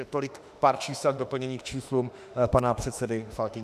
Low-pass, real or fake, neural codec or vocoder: 14.4 kHz; fake; autoencoder, 48 kHz, 32 numbers a frame, DAC-VAE, trained on Japanese speech